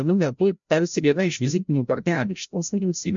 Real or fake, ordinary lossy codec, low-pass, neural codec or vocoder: fake; MP3, 64 kbps; 7.2 kHz; codec, 16 kHz, 0.5 kbps, FreqCodec, larger model